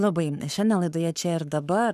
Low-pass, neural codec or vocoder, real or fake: 14.4 kHz; codec, 44.1 kHz, 7.8 kbps, Pupu-Codec; fake